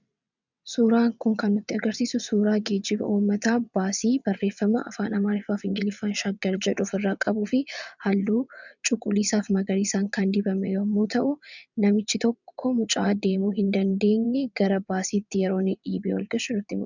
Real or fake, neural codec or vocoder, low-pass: fake; vocoder, 22.05 kHz, 80 mel bands, WaveNeXt; 7.2 kHz